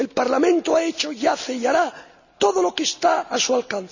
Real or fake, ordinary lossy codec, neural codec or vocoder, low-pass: real; none; none; 7.2 kHz